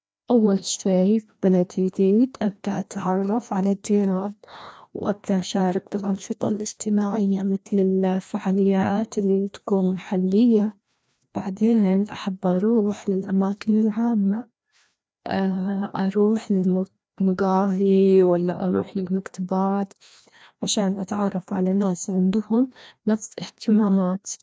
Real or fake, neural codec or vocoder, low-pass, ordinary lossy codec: fake; codec, 16 kHz, 1 kbps, FreqCodec, larger model; none; none